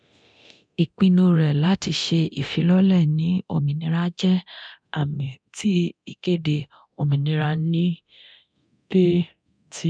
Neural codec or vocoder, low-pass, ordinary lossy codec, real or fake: codec, 24 kHz, 0.9 kbps, DualCodec; 9.9 kHz; none; fake